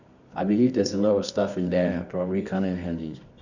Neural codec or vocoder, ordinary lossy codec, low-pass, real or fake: codec, 24 kHz, 0.9 kbps, WavTokenizer, medium music audio release; none; 7.2 kHz; fake